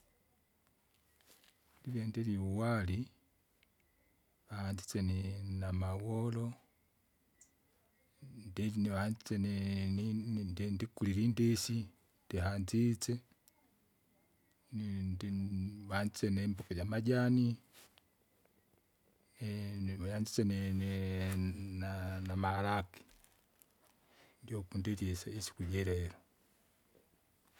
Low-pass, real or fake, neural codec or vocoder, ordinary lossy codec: 19.8 kHz; real; none; none